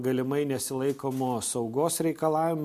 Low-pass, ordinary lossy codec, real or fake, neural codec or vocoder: 14.4 kHz; MP3, 64 kbps; real; none